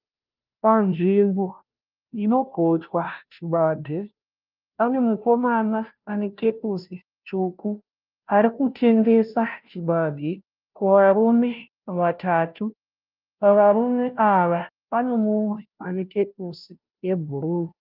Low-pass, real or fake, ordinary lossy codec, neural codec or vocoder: 5.4 kHz; fake; Opus, 24 kbps; codec, 16 kHz, 0.5 kbps, FunCodec, trained on Chinese and English, 25 frames a second